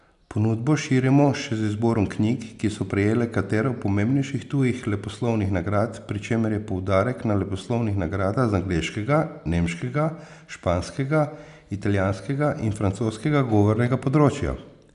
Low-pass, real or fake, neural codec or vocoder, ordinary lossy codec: 10.8 kHz; real; none; none